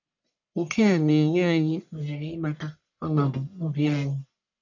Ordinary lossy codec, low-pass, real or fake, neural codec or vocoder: none; 7.2 kHz; fake; codec, 44.1 kHz, 1.7 kbps, Pupu-Codec